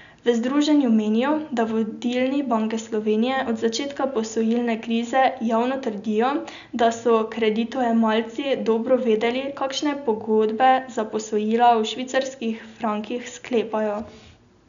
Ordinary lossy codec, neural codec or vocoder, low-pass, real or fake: none; none; 7.2 kHz; real